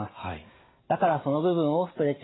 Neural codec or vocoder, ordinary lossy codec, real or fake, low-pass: none; AAC, 16 kbps; real; 7.2 kHz